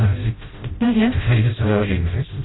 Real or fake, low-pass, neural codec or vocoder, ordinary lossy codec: fake; 7.2 kHz; codec, 16 kHz, 0.5 kbps, FreqCodec, smaller model; AAC, 16 kbps